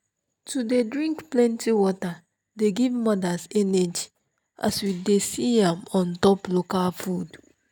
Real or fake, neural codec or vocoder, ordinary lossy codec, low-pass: real; none; none; none